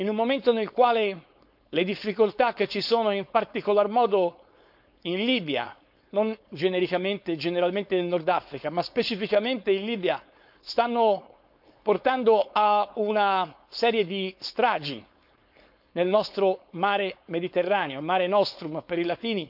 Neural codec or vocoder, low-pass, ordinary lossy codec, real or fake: codec, 16 kHz, 4.8 kbps, FACodec; 5.4 kHz; none; fake